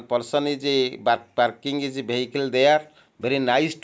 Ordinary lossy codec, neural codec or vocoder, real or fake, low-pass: none; none; real; none